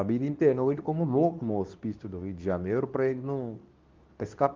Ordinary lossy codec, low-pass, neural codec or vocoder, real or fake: Opus, 24 kbps; 7.2 kHz; codec, 24 kHz, 0.9 kbps, WavTokenizer, medium speech release version 2; fake